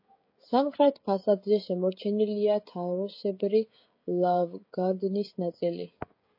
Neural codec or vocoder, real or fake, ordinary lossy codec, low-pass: codec, 16 kHz, 16 kbps, FreqCodec, smaller model; fake; MP3, 32 kbps; 5.4 kHz